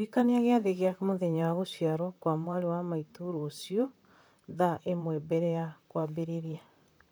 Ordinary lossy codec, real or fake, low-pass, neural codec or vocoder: none; fake; none; vocoder, 44.1 kHz, 128 mel bands, Pupu-Vocoder